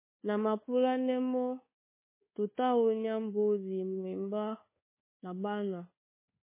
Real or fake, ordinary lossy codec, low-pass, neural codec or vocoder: fake; MP3, 16 kbps; 3.6 kHz; codec, 24 kHz, 1.2 kbps, DualCodec